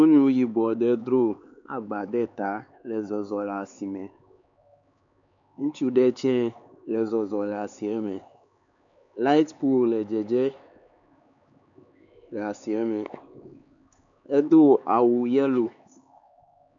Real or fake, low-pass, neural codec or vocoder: fake; 7.2 kHz; codec, 16 kHz, 4 kbps, X-Codec, HuBERT features, trained on LibriSpeech